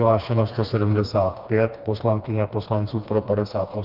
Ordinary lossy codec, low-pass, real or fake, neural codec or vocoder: Opus, 32 kbps; 5.4 kHz; fake; codec, 16 kHz, 2 kbps, FreqCodec, smaller model